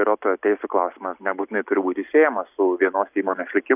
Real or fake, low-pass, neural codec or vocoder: real; 3.6 kHz; none